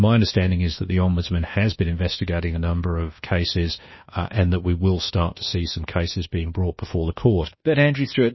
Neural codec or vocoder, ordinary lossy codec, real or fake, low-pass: codec, 24 kHz, 1.2 kbps, DualCodec; MP3, 24 kbps; fake; 7.2 kHz